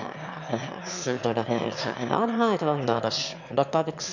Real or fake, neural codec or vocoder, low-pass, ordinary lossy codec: fake; autoencoder, 22.05 kHz, a latent of 192 numbers a frame, VITS, trained on one speaker; 7.2 kHz; none